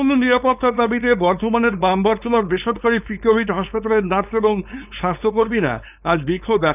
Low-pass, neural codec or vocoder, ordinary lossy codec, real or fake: 3.6 kHz; codec, 16 kHz, 4.8 kbps, FACodec; none; fake